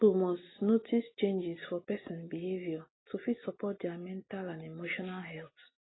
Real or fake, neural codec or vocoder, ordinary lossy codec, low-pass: real; none; AAC, 16 kbps; 7.2 kHz